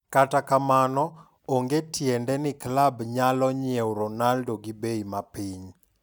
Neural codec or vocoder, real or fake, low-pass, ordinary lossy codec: none; real; none; none